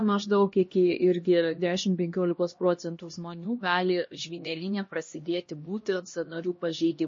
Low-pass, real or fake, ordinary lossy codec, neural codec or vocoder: 7.2 kHz; fake; MP3, 32 kbps; codec, 16 kHz, 1 kbps, X-Codec, HuBERT features, trained on LibriSpeech